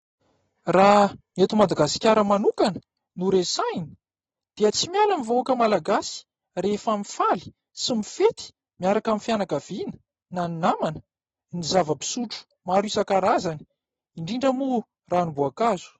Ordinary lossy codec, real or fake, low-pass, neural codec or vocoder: AAC, 24 kbps; real; 19.8 kHz; none